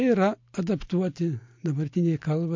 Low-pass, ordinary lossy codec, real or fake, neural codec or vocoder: 7.2 kHz; MP3, 48 kbps; real; none